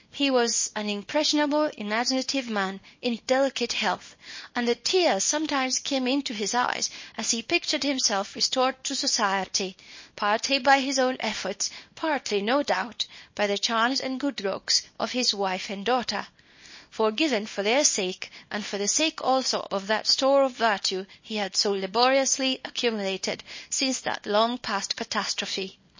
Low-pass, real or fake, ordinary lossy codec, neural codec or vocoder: 7.2 kHz; fake; MP3, 32 kbps; codec, 24 kHz, 0.9 kbps, WavTokenizer, small release